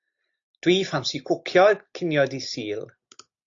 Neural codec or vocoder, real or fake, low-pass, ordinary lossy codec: none; real; 7.2 kHz; AAC, 48 kbps